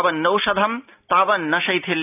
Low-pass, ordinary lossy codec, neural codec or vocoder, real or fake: 3.6 kHz; none; none; real